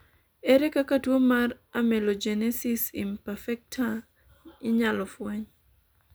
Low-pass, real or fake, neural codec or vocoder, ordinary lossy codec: none; real; none; none